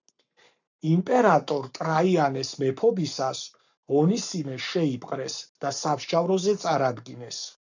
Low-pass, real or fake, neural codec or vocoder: 7.2 kHz; fake; codec, 44.1 kHz, 7.8 kbps, Pupu-Codec